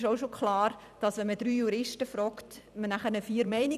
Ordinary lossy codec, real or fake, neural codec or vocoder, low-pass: none; fake; vocoder, 44.1 kHz, 128 mel bands every 256 samples, BigVGAN v2; 14.4 kHz